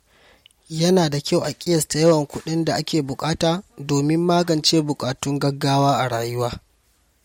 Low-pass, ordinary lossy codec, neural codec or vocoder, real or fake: 19.8 kHz; MP3, 64 kbps; none; real